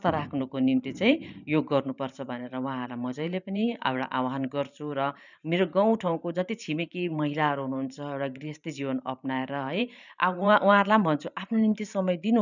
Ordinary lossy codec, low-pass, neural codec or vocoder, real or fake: none; 7.2 kHz; vocoder, 44.1 kHz, 128 mel bands every 512 samples, BigVGAN v2; fake